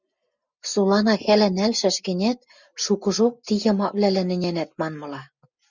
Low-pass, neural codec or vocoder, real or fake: 7.2 kHz; none; real